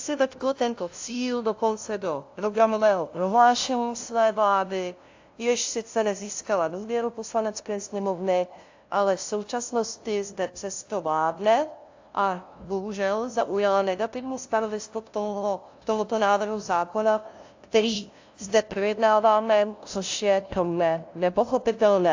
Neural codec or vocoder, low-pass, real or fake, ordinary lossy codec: codec, 16 kHz, 0.5 kbps, FunCodec, trained on LibriTTS, 25 frames a second; 7.2 kHz; fake; AAC, 48 kbps